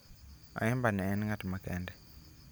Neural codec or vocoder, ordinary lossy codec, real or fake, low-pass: none; none; real; none